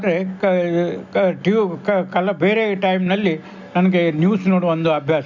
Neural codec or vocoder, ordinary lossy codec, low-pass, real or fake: none; none; 7.2 kHz; real